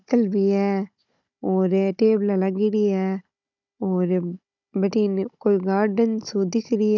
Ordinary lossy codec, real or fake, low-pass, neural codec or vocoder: none; fake; 7.2 kHz; codec, 16 kHz, 16 kbps, FunCodec, trained on Chinese and English, 50 frames a second